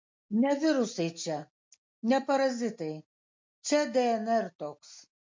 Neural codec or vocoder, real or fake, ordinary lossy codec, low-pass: none; real; MP3, 48 kbps; 7.2 kHz